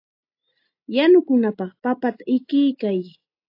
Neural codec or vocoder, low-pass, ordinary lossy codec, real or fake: none; 5.4 kHz; AAC, 32 kbps; real